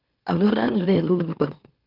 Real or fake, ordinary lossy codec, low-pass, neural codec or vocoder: fake; Opus, 32 kbps; 5.4 kHz; autoencoder, 44.1 kHz, a latent of 192 numbers a frame, MeloTTS